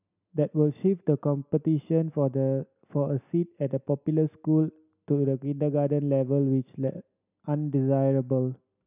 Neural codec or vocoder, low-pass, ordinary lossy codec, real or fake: none; 3.6 kHz; none; real